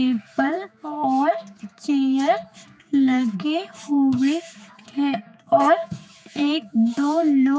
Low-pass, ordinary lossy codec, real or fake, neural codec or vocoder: none; none; fake; codec, 16 kHz, 4 kbps, X-Codec, HuBERT features, trained on general audio